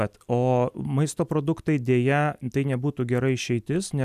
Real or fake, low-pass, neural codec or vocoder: real; 14.4 kHz; none